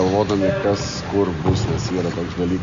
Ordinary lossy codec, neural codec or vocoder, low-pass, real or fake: AAC, 64 kbps; none; 7.2 kHz; real